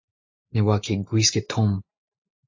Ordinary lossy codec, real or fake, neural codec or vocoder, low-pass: AAC, 48 kbps; real; none; 7.2 kHz